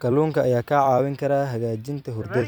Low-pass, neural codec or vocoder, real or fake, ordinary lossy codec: none; vocoder, 44.1 kHz, 128 mel bands every 256 samples, BigVGAN v2; fake; none